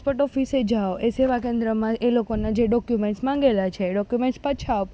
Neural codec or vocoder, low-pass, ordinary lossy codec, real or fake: none; none; none; real